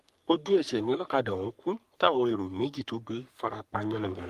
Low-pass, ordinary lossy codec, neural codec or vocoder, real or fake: 14.4 kHz; Opus, 32 kbps; codec, 44.1 kHz, 3.4 kbps, Pupu-Codec; fake